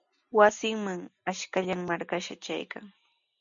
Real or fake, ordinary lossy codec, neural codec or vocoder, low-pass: real; MP3, 64 kbps; none; 7.2 kHz